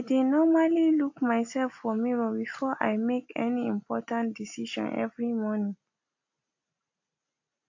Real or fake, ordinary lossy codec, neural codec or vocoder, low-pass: real; AAC, 48 kbps; none; 7.2 kHz